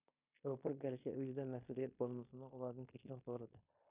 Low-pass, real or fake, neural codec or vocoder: 3.6 kHz; fake; codec, 16 kHz in and 24 kHz out, 0.9 kbps, LongCat-Audio-Codec, fine tuned four codebook decoder